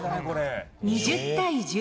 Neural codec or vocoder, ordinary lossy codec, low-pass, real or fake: none; none; none; real